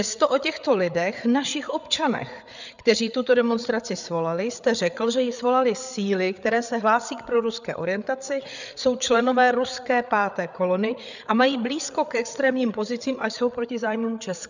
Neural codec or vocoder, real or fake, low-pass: codec, 16 kHz, 8 kbps, FreqCodec, larger model; fake; 7.2 kHz